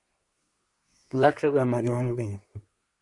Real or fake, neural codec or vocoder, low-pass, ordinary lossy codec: fake; codec, 24 kHz, 1 kbps, SNAC; 10.8 kHz; MP3, 64 kbps